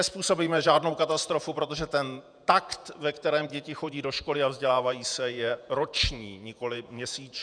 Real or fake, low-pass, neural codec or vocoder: fake; 9.9 kHz; vocoder, 48 kHz, 128 mel bands, Vocos